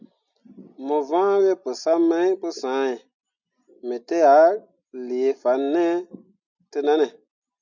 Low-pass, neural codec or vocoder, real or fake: 7.2 kHz; none; real